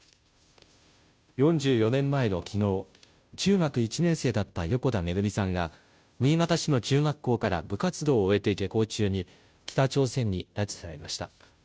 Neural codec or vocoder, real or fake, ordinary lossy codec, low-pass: codec, 16 kHz, 0.5 kbps, FunCodec, trained on Chinese and English, 25 frames a second; fake; none; none